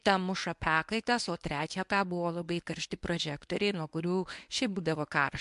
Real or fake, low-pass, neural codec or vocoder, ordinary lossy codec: fake; 10.8 kHz; codec, 24 kHz, 0.9 kbps, WavTokenizer, medium speech release version 1; MP3, 64 kbps